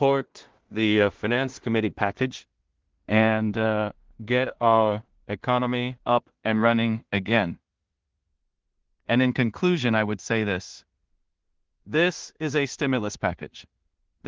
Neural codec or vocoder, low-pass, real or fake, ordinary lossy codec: codec, 16 kHz in and 24 kHz out, 0.4 kbps, LongCat-Audio-Codec, two codebook decoder; 7.2 kHz; fake; Opus, 16 kbps